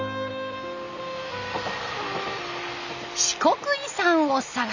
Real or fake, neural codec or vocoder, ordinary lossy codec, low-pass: real; none; none; 7.2 kHz